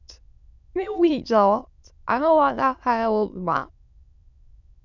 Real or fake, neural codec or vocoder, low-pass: fake; autoencoder, 22.05 kHz, a latent of 192 numbers a frame, VITS, trained on many speakers; 7.2 kHz